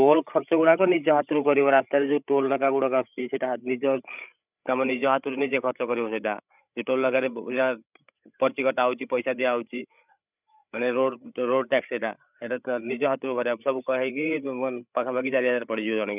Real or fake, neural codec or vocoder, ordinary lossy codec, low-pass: fake; codec, 16 kHz, 8 kbps, FreqCodec, larger model; none; 3.6 kHz